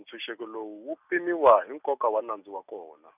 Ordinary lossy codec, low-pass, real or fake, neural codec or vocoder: none; 3.6 kHz; real; none